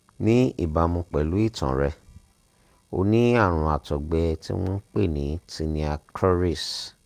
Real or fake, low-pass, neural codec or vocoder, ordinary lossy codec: real; 14.4 kHz; none; Opus, 32 kbps